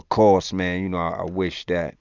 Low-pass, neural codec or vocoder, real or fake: 7.2 kHz; none; real